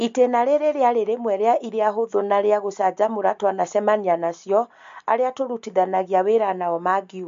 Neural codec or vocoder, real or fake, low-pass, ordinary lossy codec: none; real; 7.2 kHz; MP3, 48 kbps